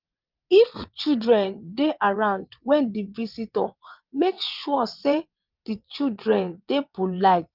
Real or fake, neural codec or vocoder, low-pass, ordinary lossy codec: fake; vocoder, 22.05 kHz, 80 mel bands, WaveNeXt; 5.4 kHz; Opus, 24 kbps